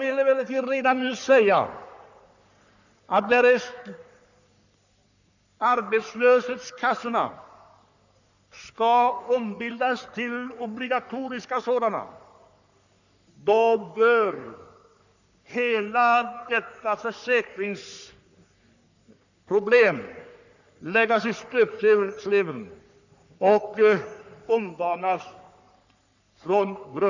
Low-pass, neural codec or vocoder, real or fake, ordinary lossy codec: 7.2 kHz; codec, 44.1 kHz, 3.4 kbps, Pupu-Codec; fake; none